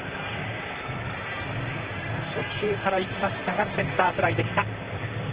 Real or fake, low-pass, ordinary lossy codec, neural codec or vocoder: fake; 3.6 kHz; Opus, 16 kbps; vocoder, 44.1 kHz, 128 mel bands, Pupu-Vocoder